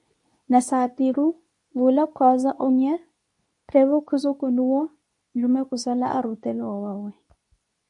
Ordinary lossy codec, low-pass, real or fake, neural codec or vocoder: MP3, 96 kbps; 10.8 kHz; fake; codec, 24 kHz, 0.9 kbps, WavTokenizer, medium speech release version 2